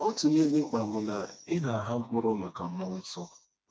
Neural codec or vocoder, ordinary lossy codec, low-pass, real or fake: codec, 16 kHz, 2 kbps, FreqCodec, smaller model; none; none; fake